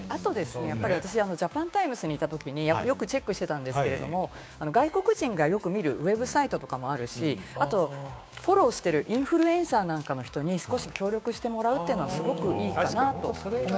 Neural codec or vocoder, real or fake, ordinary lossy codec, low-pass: codec, 16 kHz, 6 kbps, DAC; fake; none; none